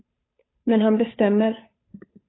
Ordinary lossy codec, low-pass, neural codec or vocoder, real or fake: AAC, 16 kbps; 7.2 kHz; codec, 16 kHz, 8 kbps, FunCodec, trained on Chinese and English, 25 frames a second; fake